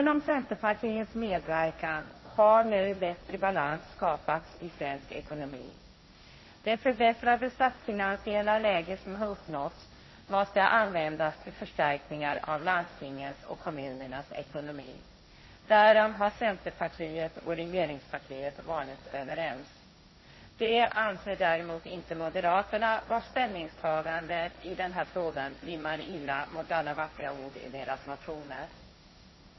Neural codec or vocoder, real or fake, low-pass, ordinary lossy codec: codec, 16 kHz, 1.1 kbps, Voila-Tokenizer; fake; 7.2 kHz; MP3, 24 kbps